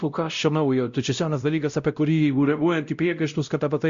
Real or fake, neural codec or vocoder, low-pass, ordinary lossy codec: fake; codec, 16 kHz, 0.5 kbps, X-Codec, WavLM features, trained on Multilingual LibriSpeech; 7.2 kHz; Opus, 64 kbps